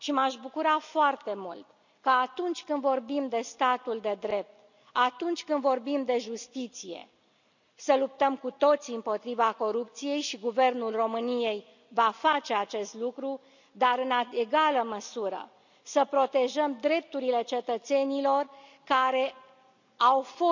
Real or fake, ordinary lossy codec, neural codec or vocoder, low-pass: real; none; none; 7.2 kHz